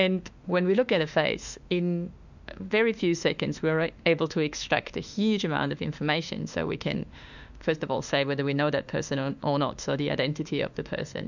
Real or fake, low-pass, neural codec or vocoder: fake; 7.2 kHz; autoencoder, 48 kHz, 32 numbers a frame, DAC-VAE, trained on Japanese speech